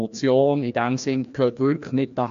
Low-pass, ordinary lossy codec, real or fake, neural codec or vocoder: 7.2 kHz; AAC, 64 kbps; fake; codec, 16 kHz, 1 kbps, FreqCodec, larger model